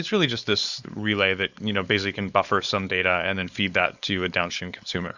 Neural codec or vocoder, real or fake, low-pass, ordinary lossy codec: codec, 16 kHz, 4.8 kbps, FACodec; fake; 7.2 kHz; Opus, 64 kbps